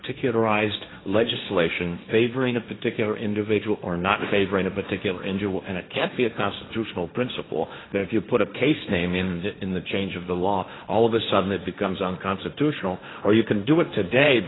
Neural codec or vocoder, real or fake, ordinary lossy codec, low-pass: codec, 16 kHz, 1.1 kbps, Voila-Tokenizer; fake; AAC, 16 kbps; 7.2 kHz